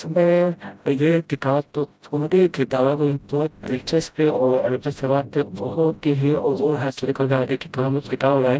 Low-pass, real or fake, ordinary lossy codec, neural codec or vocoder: none; fake; none; codec, 16 kHz, 0.5 kbps, FreqCodec, smaller model